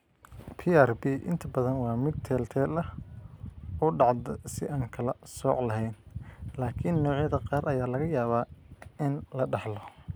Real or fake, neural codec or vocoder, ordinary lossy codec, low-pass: real; none; none; none